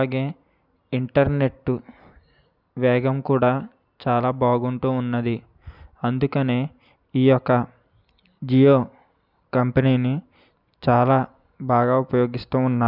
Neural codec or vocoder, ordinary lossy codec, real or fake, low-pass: none; none; real; 5.4 kHz